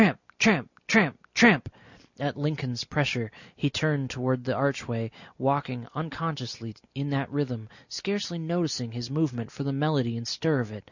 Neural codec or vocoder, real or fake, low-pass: none; real; 7.2 kHz